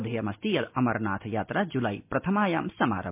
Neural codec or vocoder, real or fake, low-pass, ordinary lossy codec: none; real; 3.6 kHz; MP3, 32 kbps